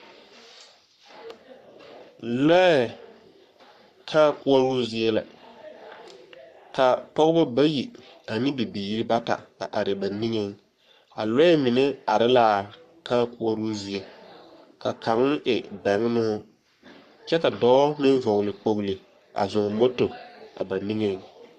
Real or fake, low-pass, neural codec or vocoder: fake; 14.4 kHz; codec, 44.1 kHz, 3.4 kbps, Pupu-Codec